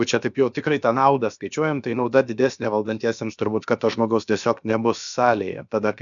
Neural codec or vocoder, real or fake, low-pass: codec, 16 kHz, about 1 kbps, DyCAST, with the encoder's durations; fake; 7.2 kHz